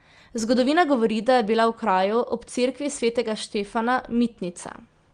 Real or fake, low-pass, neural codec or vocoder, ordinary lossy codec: real; 9.9 kHz; none; Opus, 24 kbps